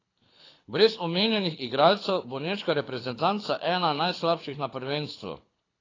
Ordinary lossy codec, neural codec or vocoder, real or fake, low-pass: AAC, 32 kbps; codec, 24 kHz, 6 kbps, HILCodec; fake; 7.2 kHz